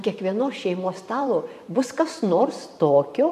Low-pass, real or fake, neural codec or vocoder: 14.4 kHz; fake; vocoder, 44.1 kHz, 128 mel bands every 512 samples, BigVGAN v2